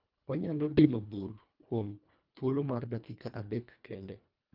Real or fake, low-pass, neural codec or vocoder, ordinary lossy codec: fake; 5.4 kHz; codec, 24 kHz, 1.5 kbps, HILCodec; Opus, 24 kbps